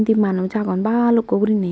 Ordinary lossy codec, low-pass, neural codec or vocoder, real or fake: none; none; none; real